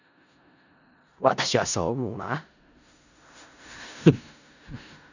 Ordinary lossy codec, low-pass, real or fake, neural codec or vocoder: none; 7.2 kHz; fake; codec, 16 kHz in and 24 kHz out, 0.4 kbps, LongCat-Audio-Codec, four codebook decoder